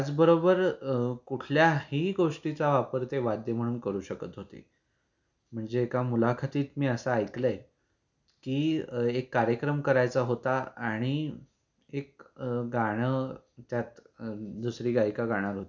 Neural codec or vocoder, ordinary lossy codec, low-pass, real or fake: none; none; 7.2 kHz; real